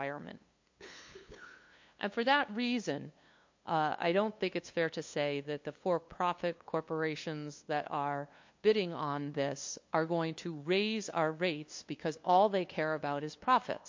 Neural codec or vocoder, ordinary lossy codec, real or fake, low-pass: codec, 16 kHz, 2 kbps, FunCodec, trained on LibriTTS, 25 frames a second; MP3, 48 kbps; fake; 7.2 kHz